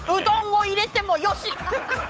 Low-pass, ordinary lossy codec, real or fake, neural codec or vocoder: none; none; fake; codec, 16 kHz, 8 kbps, FunCodec, trained on Chinese and English, 25 frames a second